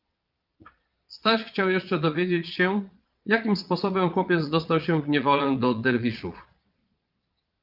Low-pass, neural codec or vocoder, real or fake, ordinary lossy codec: 5.4 kHz; vocoder, 22.05 kHz, 80 mel bands, Vocos; fake; Opus, 24 kbps